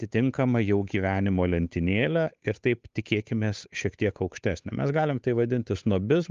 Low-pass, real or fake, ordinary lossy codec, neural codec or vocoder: 7.2 kHz; fake; Opus, 32 kbps; codec, 16 kHz, 8 kbps, FunCodec, trained on Chinese and English, 25 frames a second